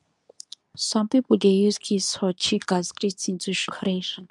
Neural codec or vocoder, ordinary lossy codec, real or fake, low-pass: codec, 24 kHz, 0.9 kbps, WavTokenizer, medium speech release version 2; none; fake; 10.8 kHz